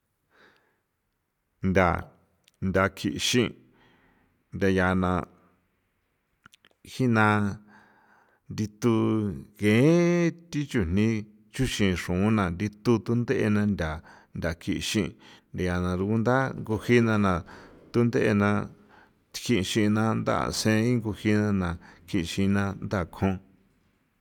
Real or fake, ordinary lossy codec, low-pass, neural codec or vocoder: real; none; 19.8 kHz; none